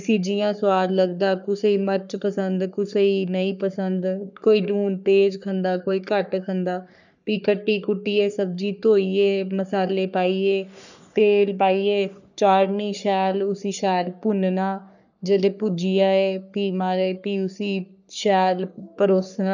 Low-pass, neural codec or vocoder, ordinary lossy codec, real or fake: 7.2 kHz; codec, 44.1 kHz, 3.4 kbps, Pupu-Codec; none; fake